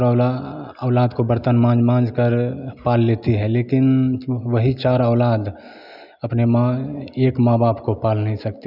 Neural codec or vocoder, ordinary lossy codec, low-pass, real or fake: none; none; 5.4 kHz; real